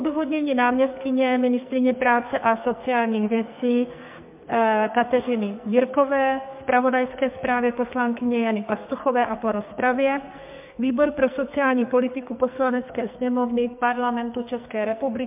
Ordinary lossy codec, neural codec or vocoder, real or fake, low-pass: MP3, 32 kbps; codec, 44.1 kHz, 2.6 kbps, SNAC; fake; 3.6 kHz